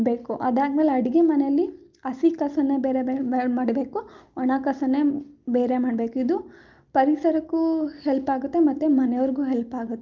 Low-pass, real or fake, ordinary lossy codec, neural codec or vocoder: 7.2 kHz; real; Opus, 24 kbps; none